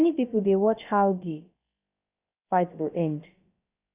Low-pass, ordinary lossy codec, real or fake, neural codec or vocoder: 3.6 kHz; Opus, 64 kbps; fake; codec, 16 kHz, about 1 kbps, DyCAST, with the encoder's durations